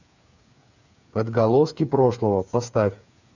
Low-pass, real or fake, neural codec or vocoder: 7.2 kHz; fake; codec, 16 kHz, 8 kbps, FreqCodec, smaller model